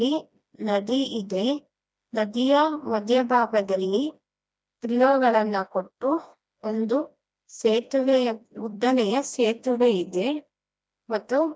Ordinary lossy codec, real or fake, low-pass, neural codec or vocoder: none; fake; none; codec, 16 kHz, 1 kbps, FreqCodec, smaller model